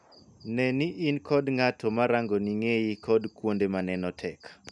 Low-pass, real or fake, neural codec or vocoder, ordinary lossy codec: 10.8 kHz; real; none; none